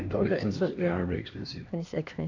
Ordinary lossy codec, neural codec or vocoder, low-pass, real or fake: none; codec, 16 kHz, 2 kbps, X-Codec, HuBERT features, trained on LibriSpeech; 7.2 kHz; fake